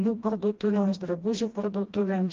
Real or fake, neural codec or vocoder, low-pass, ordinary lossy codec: fake; codec, 16 kHz, 1 kbps, FreqCodec, smaller model; 7.2 kHz; Opus, 32 kbps